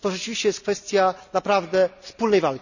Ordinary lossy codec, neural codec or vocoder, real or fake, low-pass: none; none; real; 7.2 kHz